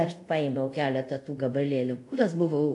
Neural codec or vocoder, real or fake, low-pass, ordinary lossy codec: codec, 24 kHz, 0.5 kbps, DualCodec; fake; 10.8 kHz; AAC, 64 kbps